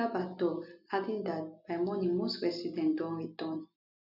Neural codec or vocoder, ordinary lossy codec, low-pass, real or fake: none; none; 5.4 kHz; real